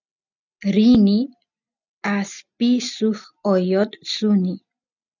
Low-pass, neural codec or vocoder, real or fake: 7.2 kHz; none; real